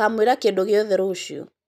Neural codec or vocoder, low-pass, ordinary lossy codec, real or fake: none; 14.4 kHz; none; real